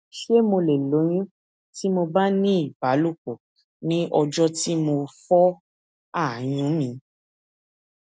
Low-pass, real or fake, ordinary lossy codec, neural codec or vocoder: none; real; none; none